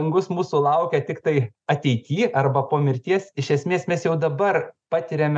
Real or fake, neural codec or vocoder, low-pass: real; none; 9.9 kHz